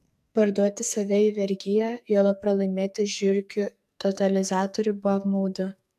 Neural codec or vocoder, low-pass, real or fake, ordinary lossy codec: codec, 44.1 kHz, 2.6 kbps, SNAC; 14.4 kHz; fake; AAC, 96 kbps